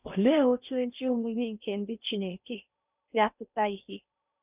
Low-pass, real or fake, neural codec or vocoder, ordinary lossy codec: 3.6 kHz; fake; codec, 16 kHz in and 24 kHz out, 0.6 kbps, FocalCodec, streaming, 4096 codes; none